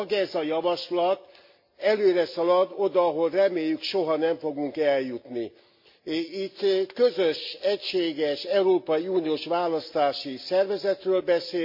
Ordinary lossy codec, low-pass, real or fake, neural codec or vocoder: MP3, 32 kbps; 5.4 kHz; real; none